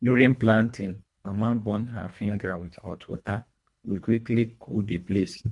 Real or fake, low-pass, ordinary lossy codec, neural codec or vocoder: fake; 10.8 kHz; MP3, 64 kbps; codec, 24 kHz, 1.5 kbps, HILCodec